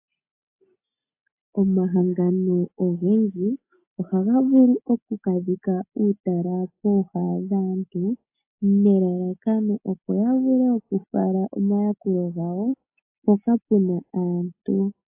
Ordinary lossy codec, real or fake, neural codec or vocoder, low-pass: AAC, 24 kbps; real; none; 3.6 kHz